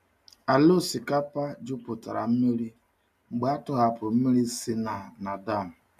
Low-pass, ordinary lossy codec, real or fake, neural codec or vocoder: 14.4 kHz; none; real; none